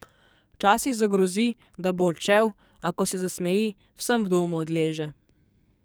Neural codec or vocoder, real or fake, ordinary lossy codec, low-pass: codec, 44.1 kHz, 2.6 kbps, SNAC; fake; none; none